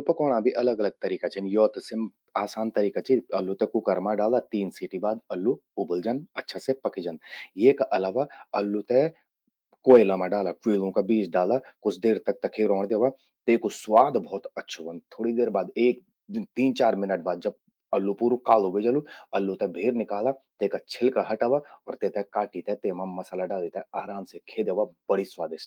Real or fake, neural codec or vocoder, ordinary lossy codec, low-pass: fake; autoencoder, 48 kHz, 128 numbers a frame, DAC-VAE, trained on Japanese speech; Opus, 32 kbps; 19.8 kHz